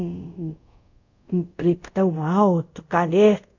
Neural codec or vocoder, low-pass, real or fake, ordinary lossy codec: codec, 24 kHz, 0.5 kbps, DualCodec; 7.2 kHz; fake; none